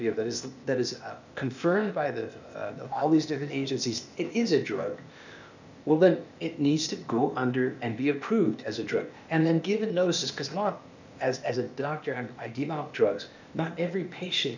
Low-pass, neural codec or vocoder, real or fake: 7.2 kHz; codec, 16 kHz, 0.8 kbps, ZipCodec; fake